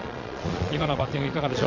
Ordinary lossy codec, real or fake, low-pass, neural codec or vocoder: AAC, 48 kbps; fake; 7.2 kHz; vocoder, 22.05 kHz, 80 mel bands, Vocos